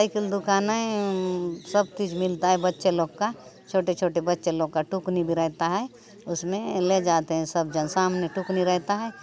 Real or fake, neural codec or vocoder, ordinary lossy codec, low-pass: real; none; none; none